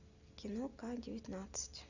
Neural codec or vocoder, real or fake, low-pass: none; real; 7.2 kHz